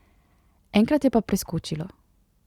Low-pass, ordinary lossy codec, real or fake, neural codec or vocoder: 19.8 kHz; Opus, 64 kbps; real; none